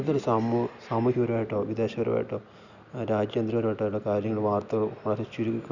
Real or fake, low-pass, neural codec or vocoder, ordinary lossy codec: real; 7.2 kHz; none; none